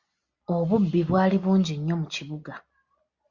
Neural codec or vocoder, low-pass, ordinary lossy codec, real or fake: none; 7.2 kHz; Opus, 64 kbps; real